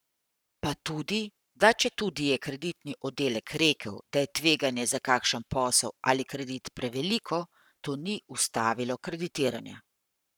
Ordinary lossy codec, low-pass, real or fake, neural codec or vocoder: none; none; fake; codec, 44.1 kHz, 7.8 kbps, Pupu-Codec